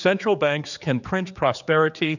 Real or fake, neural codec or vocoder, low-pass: fake; codec, 16 kHz, 4 kbps, X-Codec, HuBERT features, trained on general audio; 7.2 kHz